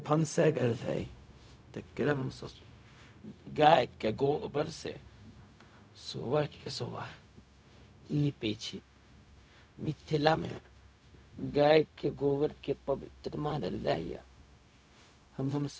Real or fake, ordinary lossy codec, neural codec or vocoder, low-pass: fake; none; codec, 16 kHz, 0.4 kbps, LongCat-Audio-Codec; none